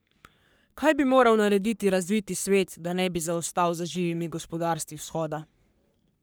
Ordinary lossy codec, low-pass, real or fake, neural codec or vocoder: none; none; fake; codec, 44.1 kHz, 3.4 kbps, Pupu-Codec